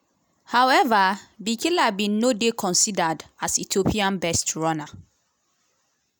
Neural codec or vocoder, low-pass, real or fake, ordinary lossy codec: none; none; real; none